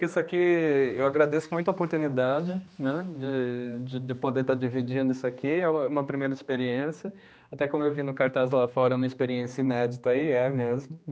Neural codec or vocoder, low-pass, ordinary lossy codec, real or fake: codec, 16 kHz, 2 kbps, X-Codec, HuBERT features, trained on general audio; none; none; fake